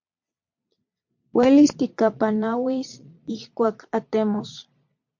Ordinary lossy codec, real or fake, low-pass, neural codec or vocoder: MP3, 48 kbps; fake; 7.2 kHz; vocoder, 22.05 kHz, 80 mel bands, Vocos